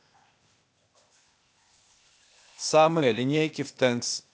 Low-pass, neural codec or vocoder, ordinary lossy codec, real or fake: none; codec, 16 kHz, 0.8 kbps, ZipCodec; none; fake